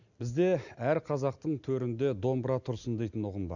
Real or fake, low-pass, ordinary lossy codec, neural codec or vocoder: real; 7.2 kHz; none; none